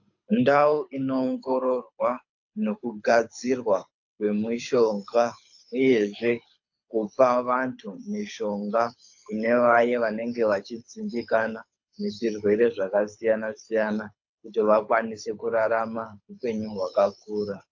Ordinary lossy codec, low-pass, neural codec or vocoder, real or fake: AAC, 48 kbps; 7.2 kHz; codec, 24 kHz, 6 kbps, HILCodec; fake